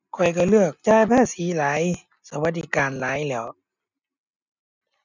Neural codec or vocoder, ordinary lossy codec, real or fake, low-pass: none; none; real; 7.2 kHz